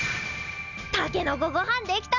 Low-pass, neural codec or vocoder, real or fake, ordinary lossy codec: 7.2 kHz; none; real; none